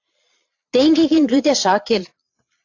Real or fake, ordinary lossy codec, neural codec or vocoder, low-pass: fake; AAC, 48 kbps; vocoder, 22.05 kHz, 80 mel bands, Vocos; 7.2 kHz